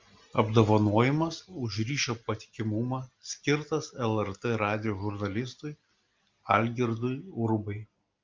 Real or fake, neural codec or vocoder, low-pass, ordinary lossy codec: real; none; 7.2 kHz; Opus, 32 kbps